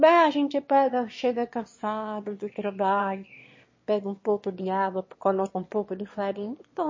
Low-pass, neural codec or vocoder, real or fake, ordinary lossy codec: 7.2 kHz; autoencoder, 22.05 kHz, a latent of 192 numbers a frame, VITS, trained on one speaker; fake; MP3, 32 kbps